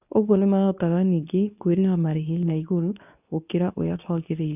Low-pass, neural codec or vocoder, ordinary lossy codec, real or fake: 3.6 kHz; codec, 24 kHz, 0.9 kbps, WavTokenizer, medium speech release version 2; none; fake